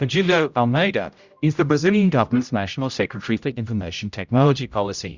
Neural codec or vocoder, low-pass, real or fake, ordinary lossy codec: codec, 16 kHz, 0.5 kbps, X-Codec, HuBERT features, trained on general audio; 7.2 kHz; fake; Opus, 64 kbps